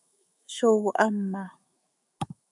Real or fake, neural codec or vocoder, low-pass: fake; autoencoder, 48 kHz, 128 numbers a frame, DAC-VAE, trained on Japanese speech; 10.8 kHz